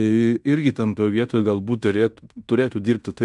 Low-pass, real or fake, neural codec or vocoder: 10.8 kHz; fake; codec, 16 kHz in and 24 kHz out, 0.9 kbps, LongCat-Audio-Codec, fine tuned four codebook decoder